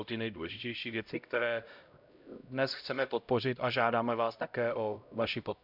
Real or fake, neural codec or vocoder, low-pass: fake; codec, 16 kHz, 0.5 kbps, X-Codec, HuBERT features, trained on LibriSpeech; 5.4 kHz